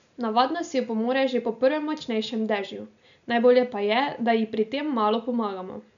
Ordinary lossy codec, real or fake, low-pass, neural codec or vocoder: none; real; 7.2 kHz; none